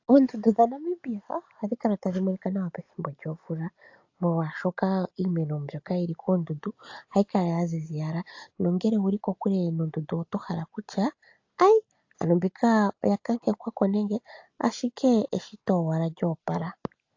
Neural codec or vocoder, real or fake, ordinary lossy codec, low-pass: none; real; AAC, 48 kbps; 7.2 kHz